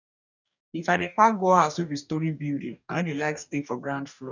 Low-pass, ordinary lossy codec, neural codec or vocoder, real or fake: 7.2 kHz; none; codec, 44.1 kHz, 2.6 kbps, DAC; fake